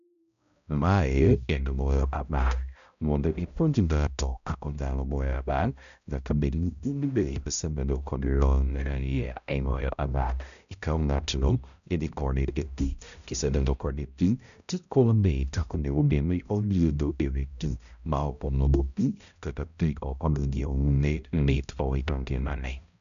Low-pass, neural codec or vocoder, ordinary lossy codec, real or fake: 7.2 kHz; codec, 16 kHz, 0.5 kbps, X-Codec, HuBERT features, trained on balanced general audio; none; fake